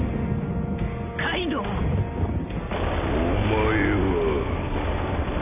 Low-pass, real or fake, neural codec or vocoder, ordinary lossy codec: 3.6 kHz; real; none; none